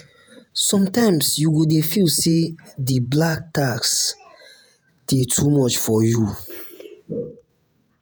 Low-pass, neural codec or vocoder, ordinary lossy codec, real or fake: none; vocoder, 48 kHz, 128 mel bands, Vocos; none; fake